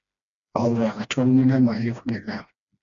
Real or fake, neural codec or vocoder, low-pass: fake; codec, 16 kHz, 1 kbps, FreqCodec, smaller model; 7.2 kHz